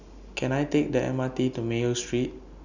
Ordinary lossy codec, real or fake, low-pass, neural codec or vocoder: none; real; 7.2 kHz; none